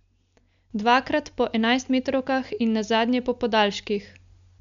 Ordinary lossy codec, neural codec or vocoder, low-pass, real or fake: MP3, 64 kbps; none; 7.2 kHz; real